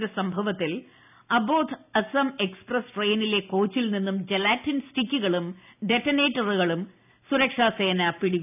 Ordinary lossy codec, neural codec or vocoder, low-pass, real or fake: none; none; 3.6 kHz; real